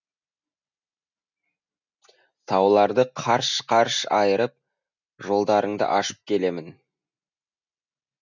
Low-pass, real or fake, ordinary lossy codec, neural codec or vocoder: 7.2 kHz; real; none; none